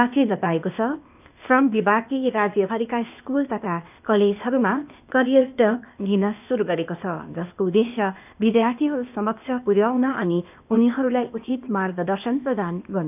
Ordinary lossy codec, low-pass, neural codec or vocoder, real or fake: none; 3.6 kHz; codec, 16 kHz, 0.8 kbps, ZipCodec; fake